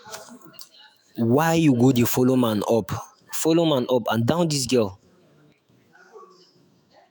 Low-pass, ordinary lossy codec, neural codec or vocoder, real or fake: none; none; autoencoder, 48 kHz, 128 numbers a frame, DAC-VAE, trained on Japanese speech; fake